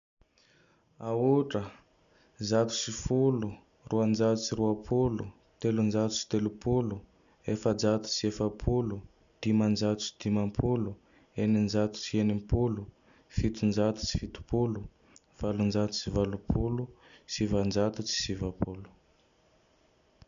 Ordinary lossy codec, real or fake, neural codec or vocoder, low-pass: AAC, 96 kbps; real; none; 7.2 kHz